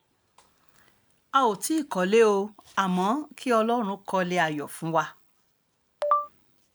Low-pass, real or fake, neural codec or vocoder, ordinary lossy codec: none; real; none; none